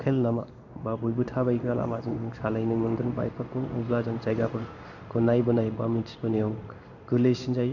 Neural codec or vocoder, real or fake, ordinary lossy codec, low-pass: codec, 16 kHz in and 24 kHz out, 1 kbps, XY-Tokenizer; fake; none; 7.2 kHz